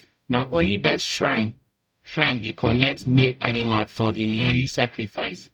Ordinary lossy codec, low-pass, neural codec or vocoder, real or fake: none; 19.8 kHz; codec, 44.1 kHz, 0.9 kbps, DAC; fake